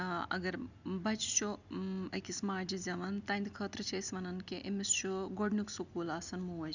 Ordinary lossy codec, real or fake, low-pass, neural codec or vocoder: none; real; 7.2 kHz; none